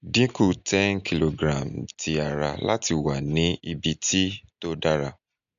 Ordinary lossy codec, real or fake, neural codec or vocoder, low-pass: AAC, 96 kbps; real; none; 7.2 kHz